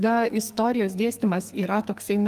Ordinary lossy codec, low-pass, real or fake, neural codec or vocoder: Opus, 32 kbps; 14.4 kHz; fake; codec, 44.1 kHz, 2.6 kbps, SNAC